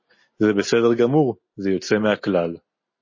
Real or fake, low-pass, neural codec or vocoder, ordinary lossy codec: real; 7.2 kHz; none; MP3, 32 kbps